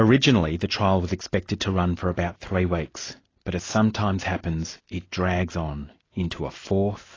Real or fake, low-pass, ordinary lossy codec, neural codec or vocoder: real; 7.2 kHz; AAC, 32 kbps; none